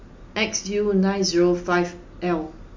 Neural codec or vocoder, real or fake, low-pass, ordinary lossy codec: none; real; 7.2 kHz; MP3, 48 kbps